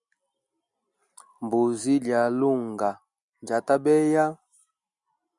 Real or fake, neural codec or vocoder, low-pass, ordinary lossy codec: real; none; 10.8 kHz; Opus, 64 kbps